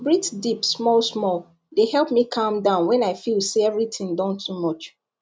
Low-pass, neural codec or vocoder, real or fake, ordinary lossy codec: none; none; real; none